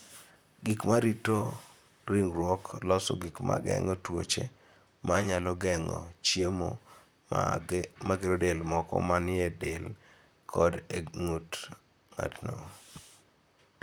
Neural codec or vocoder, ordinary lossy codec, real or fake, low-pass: vocoder, 44.1 kHz, 128 mel bands, Pupu-Vocoder; none; fake; none